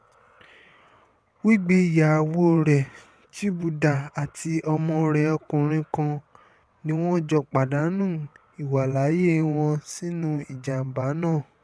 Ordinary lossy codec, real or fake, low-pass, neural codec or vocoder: none; fake; none; vocoder, 22.05 kHz, 80 mel bands, WaveNeXt